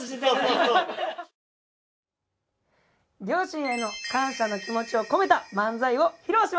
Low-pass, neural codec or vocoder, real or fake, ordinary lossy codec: none; none; real; none